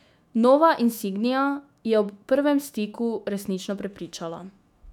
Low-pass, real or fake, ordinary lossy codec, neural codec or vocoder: 19.8 kHz; fake; none; autoencoder, 48 kHz, 128 numbers a frame, DAC-VAE, trained on Japanese speech